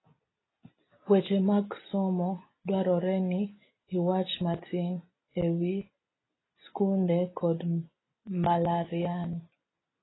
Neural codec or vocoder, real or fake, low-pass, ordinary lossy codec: none; real; 7.2 kHz; AAC, 16 kbps